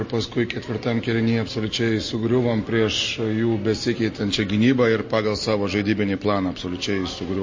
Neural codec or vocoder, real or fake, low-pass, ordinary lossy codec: none; real; 7.2 kHz; MP3, 32 kbps